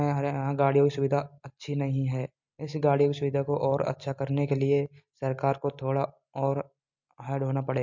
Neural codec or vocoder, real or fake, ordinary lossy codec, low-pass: none; real; MP3, 48 kbps; 7.2 kHz